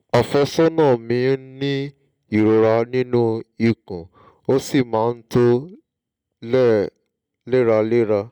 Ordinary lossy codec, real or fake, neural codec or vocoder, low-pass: none; real; none; none